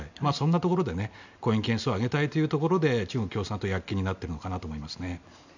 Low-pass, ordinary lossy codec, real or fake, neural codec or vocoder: 7.2 kHz; none; real; none